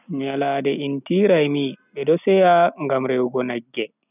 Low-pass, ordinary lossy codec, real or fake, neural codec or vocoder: 3.6 kHz; none; real; none